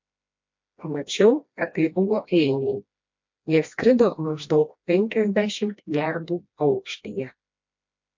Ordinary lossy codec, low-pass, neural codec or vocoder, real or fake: MP3, 48 kbps; 7.2 kHz; codec, 16 kHz, 1 kbps, FreqCodec, smaller model; fake